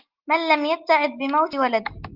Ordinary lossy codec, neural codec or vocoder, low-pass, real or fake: Opus, 32 kbps; none; 5.4 kHz; real